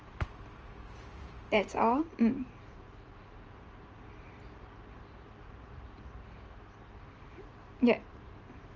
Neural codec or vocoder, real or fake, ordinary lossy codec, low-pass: vocoder, 44.1 kHz, 128 mel bands every 512 samples, BigVGAN v2; fake; Opus, 24 kbps; 7.2 kHz